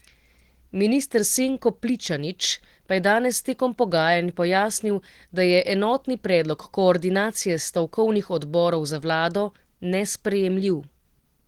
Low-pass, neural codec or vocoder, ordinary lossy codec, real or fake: 19.8 kHz; none; Opus, 16 kbps; real